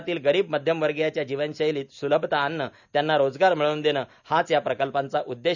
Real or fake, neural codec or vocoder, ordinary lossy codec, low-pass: real; none; none; 7.2 kHz